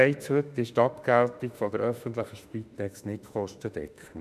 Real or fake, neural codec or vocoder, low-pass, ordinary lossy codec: fake; autoencoder, 48 kHz, 32 numbers a frame, DAC-VAE, trained on Japanese speech; 14.4 kHz; none